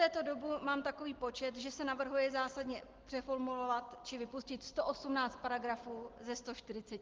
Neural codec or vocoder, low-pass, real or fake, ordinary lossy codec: none; 7.2 kHz; real; Opus, 16 kbps